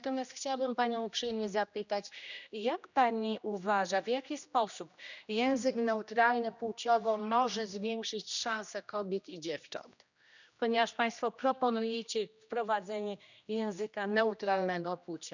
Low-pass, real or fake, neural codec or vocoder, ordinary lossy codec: 7.2 kHz; fake; codec, 16 kHz, 1 kbps, X-Codec, HuBERT features, trained on general audio; none